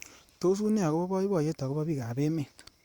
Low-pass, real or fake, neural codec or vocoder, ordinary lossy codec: 19.8 kHz; fake; vocoder, 44.1 kHz, 128 mel bands every 512 samples, BigVGAN v2; Opus, 64 kbps